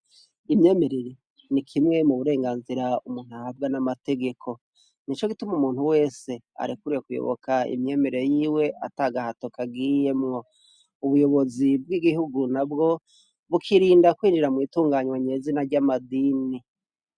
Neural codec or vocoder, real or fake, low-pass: none; real; 9.9 kHz